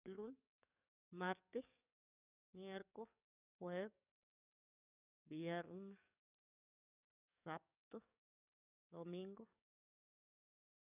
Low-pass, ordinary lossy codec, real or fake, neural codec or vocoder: 3.6 kHz; none; fake; codec, 44.1 kHz, 7.8 kbps, DAC